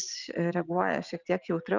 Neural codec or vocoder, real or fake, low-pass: none; real; 7.2 kHz